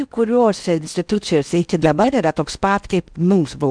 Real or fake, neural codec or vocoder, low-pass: fake; codec, 16 kHz in and 24 kHz out, 0.6 kbps, FocalCodec, streaming, 4096 codes; 9.9 kHz